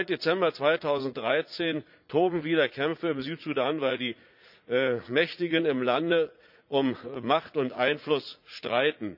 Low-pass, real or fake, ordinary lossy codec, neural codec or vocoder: 5.4 kHz; fake; none; vocoder, 44.1 kHz, 80 mel bands, Vocos